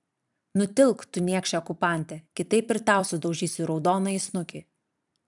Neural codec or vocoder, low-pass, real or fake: vocoder, 44.1 kHz, 128 mel bands every 256 samples, BigVGAN v2; 10.8 kHz; fake